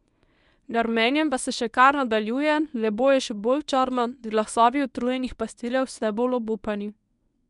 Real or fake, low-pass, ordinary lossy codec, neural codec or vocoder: fake; 10.8 kHz; none; codec, 24 kHz, 0.9 kbps, WavTokenizer, medium speech release version 1